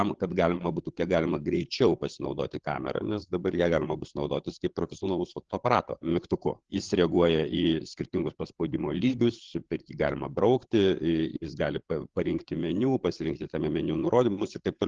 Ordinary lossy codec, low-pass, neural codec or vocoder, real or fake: Opus, 16 kbps; 7.2 kHz; codec, 16 kHz, 8 kbps, FreqCodec, larger model; fake